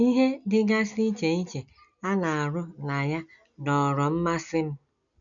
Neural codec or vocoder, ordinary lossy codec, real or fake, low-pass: none; MP3, 96 kbps; real; 7.2 kHz